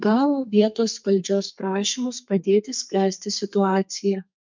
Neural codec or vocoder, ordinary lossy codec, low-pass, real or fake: codec, 32 kHz, 1.9 kbps, SNAC; MP3, 64 kbps; 7.2 kHz; fake